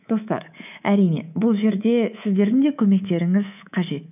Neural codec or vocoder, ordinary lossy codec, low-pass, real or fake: codec, 24 kHz, 3.1 kbps, DualCodec; none; 3.6 kHz; fake